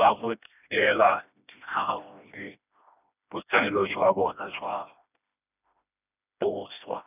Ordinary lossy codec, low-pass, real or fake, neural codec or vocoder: none; 3.6 kHz; fake; codec, 16 kHz, 1 kbps, FreqCodec, smaller model